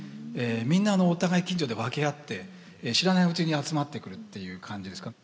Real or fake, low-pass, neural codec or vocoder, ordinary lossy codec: real; none; none; none